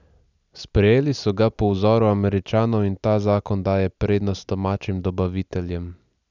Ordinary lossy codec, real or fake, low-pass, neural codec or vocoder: none; real; 7.2 kHz; none